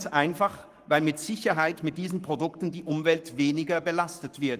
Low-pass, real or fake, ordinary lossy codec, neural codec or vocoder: 14.4 kHz; fake; Opus, 32 kbps; codec, 44.1 kHz, 7.8 kbps, Pupu-Codec